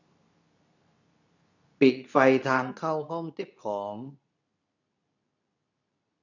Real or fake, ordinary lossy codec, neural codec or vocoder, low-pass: fake; none; codec, 24 kHz, 0.9 kbps, WavTokenizer, medium speech release version 2; 7.2 kHz